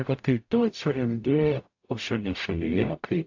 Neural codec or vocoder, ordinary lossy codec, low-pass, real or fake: codec, 44.1 kHz, 0.9 kbps, DAC; AAC, 48 kbps; 7.2 kHz; fake